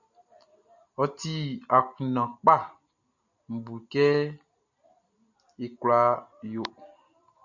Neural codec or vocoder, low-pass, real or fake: none; 7.2 kHz; real